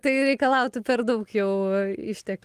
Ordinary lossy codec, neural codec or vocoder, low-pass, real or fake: Opus, 24 kbps; none; 14.4 kHz; real